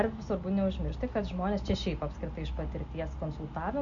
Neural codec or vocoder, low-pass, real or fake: none; 7.2 kHz; real